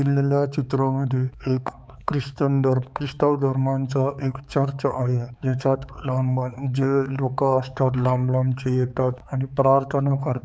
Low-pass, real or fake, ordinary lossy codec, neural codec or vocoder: none; fake; none; codec, 16 kHz, 4 kbps, X-Codec, HuBERT features, trained on balanced general audio